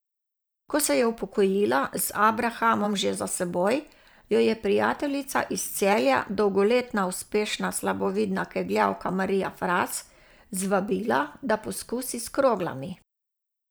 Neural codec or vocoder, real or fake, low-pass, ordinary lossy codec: vocoder, 44.1 kHz, 128 mel bands every 512 samples, BigVGAN v2; fake; none; none